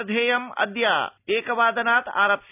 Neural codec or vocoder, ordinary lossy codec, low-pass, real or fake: none; none; 3.6 kHz; real